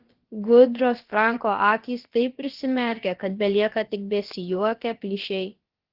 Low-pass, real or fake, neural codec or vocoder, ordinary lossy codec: 5.4 kHz; fake; codec, 16 kHz, about 1 kbps, DyCAST, with the encoder's durations; Opus, 16 kbps